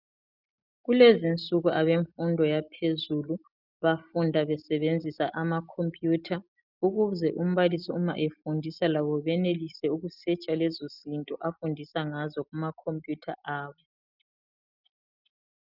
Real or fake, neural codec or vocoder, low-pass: real; none; 5.4 kHz